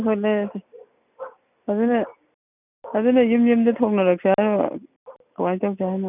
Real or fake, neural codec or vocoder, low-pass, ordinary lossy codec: real; none; 3.6 kHz; none